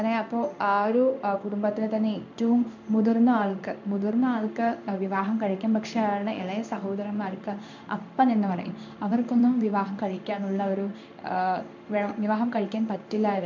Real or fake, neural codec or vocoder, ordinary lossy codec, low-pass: fake; codec, 16 kHz in and 24 kHz out, 1 kbps, XY-Tokenizer; MP3, 64 kbps; 7.2 kHz